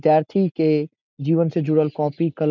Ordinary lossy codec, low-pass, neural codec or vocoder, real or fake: none; 7.2 kHz; none; real